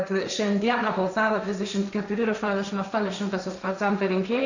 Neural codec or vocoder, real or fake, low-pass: codec, 16 kHz, 1.1 kbps, Voila-Tokenizer; fake; 7.2 kHz